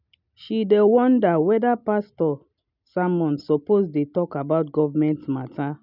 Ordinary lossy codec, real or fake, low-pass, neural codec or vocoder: none; real; 5.4 kHz; none